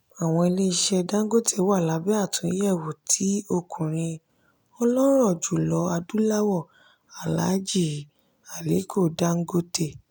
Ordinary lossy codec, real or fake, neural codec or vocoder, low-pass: none; real; none; none